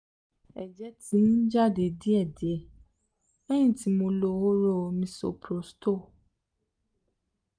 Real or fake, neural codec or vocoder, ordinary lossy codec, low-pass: real; none; none; 9.9 kHz